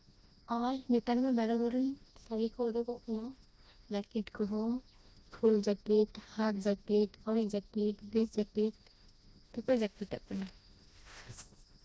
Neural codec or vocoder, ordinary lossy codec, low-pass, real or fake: codec, 16 kHz, 1 kbps, FreqCodec, smaller model; none; none; fake